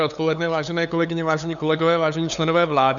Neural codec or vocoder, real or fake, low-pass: codec, 16 kHz, 8 kbps, FunCodec, trained on LibriTTS, 25 frames a second; fake; 7.2 kHz